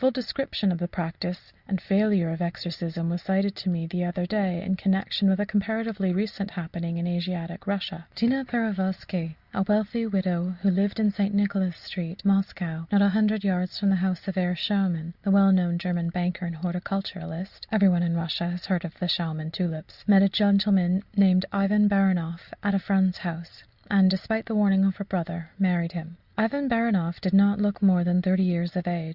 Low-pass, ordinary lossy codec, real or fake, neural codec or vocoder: 5.4 kHz; Opus, 64 kbps; real; none